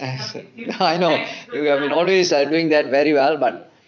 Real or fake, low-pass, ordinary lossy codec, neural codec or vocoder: fake; 7.2 kHz; MP3, 64 kbps; vocoder, 44.1 kHz, 80 mel bands, Vocos